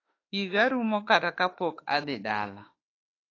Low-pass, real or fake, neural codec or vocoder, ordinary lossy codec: 7.2 kHz; fake; autoencoder, 48 kHz, 32 numbers a frame, DAC-VAE, trained on Japanese speech; AAC, 32 kbps